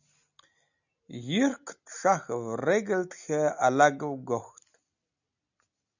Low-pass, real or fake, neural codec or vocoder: 7.2 kHz; real; none